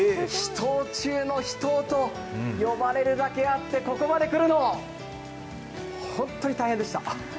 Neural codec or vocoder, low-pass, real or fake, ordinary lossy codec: none; none; real; none